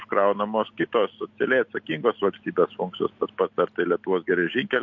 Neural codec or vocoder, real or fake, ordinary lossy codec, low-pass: none; real; MP3, 48 kbps; 7.2 kHz